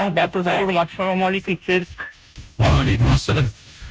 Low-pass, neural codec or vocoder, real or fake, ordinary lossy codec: none; codec, 16 kHz, 0.5 kbps, FunCodec, trained on Chinese and English, 25 frames a second; fake; none